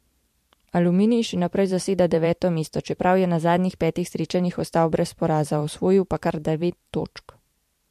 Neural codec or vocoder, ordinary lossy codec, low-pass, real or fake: vocoder, 44.1 kHz, 128 mel bands every 512 samples, BigVGAN v2; MP3, 64 kbps; 14.4 kHz; fake